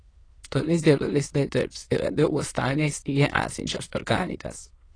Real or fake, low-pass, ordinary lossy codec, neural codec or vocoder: fake; 9.9 kHz; AAC, 32 kbps; autoencoder, 22.05 kHz, a latent of 192 numbers a frame, VITS, trained on many speakers